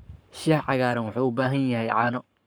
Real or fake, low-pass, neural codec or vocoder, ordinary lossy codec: fake; none; codec, 44.1 kHz, 7.8 kbps, Pupu-Codec; none